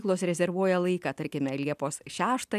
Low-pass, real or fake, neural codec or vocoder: 14.4 kHz; real; none